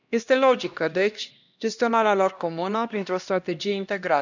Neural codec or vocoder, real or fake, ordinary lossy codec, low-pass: codec, 16 kHz, 1 kbps, X-Codec, HuBERT features, trained on LibriSpeech; fake; none; 7.2 kHz